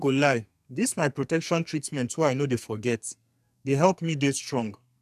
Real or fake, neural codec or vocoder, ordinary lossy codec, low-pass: fake; codec, 44.1 kHz, 2.6 kbps, SNAC; none; 14.4 kHz